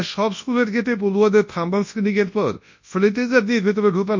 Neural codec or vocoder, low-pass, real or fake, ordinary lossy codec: codec, 24 kHz, 0.9 kbps, WavTokenizer, large speech release; 7.2 kHz; fake; MP3, 48 kbps